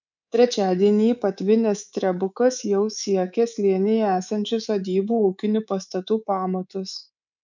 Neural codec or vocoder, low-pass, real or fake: codec, 24 kHz, 3.1 kbps, DualCodec; 7.2 kHz; fake